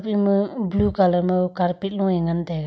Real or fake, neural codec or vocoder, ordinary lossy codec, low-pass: real; none; none; none